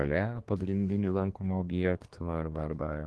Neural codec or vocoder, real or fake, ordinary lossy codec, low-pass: codec, 24 kHz, 1 kbps, SNAC; fake; Opus, 16 kbps; 10.8 kHz